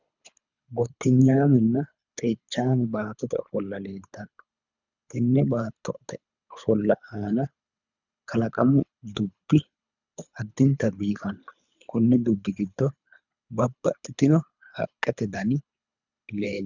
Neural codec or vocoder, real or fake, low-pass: codec, 24 kHz, 3 kbps, HILCodec; fake; 7.2 kHz